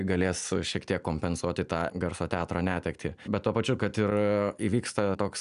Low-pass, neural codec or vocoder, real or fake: 10.8 kHz; none; real